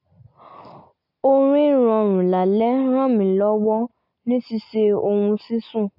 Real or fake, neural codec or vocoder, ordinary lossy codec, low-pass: real; none; none; 5.4 kHz